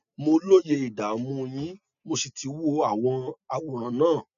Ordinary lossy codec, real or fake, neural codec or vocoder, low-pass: MP3, 96 kbps; real; none; 7.2 kHz